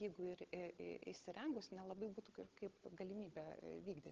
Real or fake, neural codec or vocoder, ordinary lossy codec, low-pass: fake; codec, 16 kHz, 16 kbps, FunCodec, trained on Chinese and English, 50 frames a second; Opus, 16 kbps; 7.2 kHz